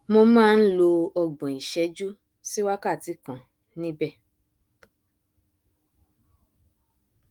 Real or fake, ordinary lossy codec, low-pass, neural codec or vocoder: fake; Opus, 24 kbps; 19.8 kHz; autoencoder, 48 kHz, 128 numbers a frame, DAC-VAE, trained on Japanese speech